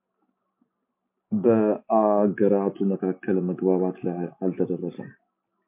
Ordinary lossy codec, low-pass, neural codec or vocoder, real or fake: MP3, 32 kbps; 3.6 kHz; none; real